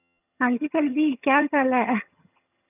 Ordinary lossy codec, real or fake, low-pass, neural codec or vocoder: none; fake; 3.6 kHz; vocoder, 22.05 kHz, 80 mel bands, HiFi-GAN